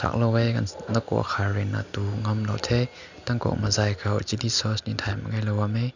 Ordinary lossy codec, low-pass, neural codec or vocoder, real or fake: none; 7.2 kHz; none; real